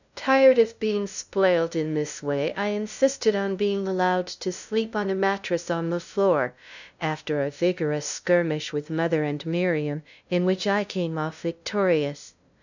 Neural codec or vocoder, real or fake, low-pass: codec, 16 kHz, 0.5 kbps, FunCodec, trained on LibriTTS, 25 frames a second; fake; 7.2 kHz